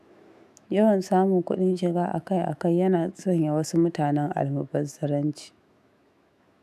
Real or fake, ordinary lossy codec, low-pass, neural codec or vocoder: fake; AAC, 96 kbps; 14.4 kHz; autoencoder, 48 kHz, 128 numbers a frame, DAC-VAE, trained on Japanese speech